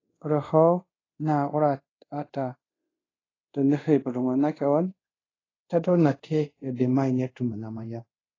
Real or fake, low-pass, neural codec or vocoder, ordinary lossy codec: fake; 7.2 kHz; codec, 24 kHz, 0.5 kbps, DualCodec; AAC, 32 kbps